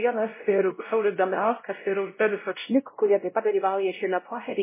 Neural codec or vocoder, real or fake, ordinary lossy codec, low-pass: codec, 16 kHz, 0.5 kbps, X-Codec, WavLM features, trained on Multilingual LibriSpeech; fake; MP3, 16 kbps; 3.6 kHz